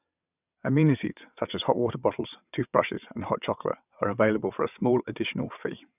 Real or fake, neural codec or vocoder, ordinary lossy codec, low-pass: fake; vocoder, 22.05 kHz, 80 mel bands, WaveNeXt; none; 3.6 kHz